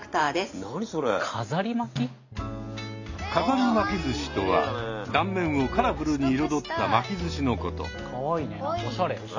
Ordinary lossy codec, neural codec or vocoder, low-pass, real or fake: AAC, 48 kbps; none; 7.2 kHz; real